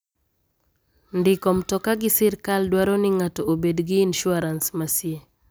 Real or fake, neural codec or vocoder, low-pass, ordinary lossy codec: real; none; none; none